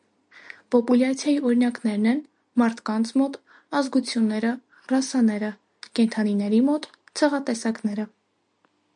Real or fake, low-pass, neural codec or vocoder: real; 9.9 kHz; none